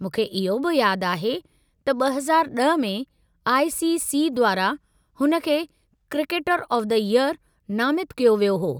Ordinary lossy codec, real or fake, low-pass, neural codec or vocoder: none; real; none; none